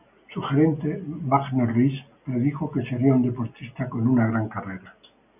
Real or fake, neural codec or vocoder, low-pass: real; none; 3.6 kHz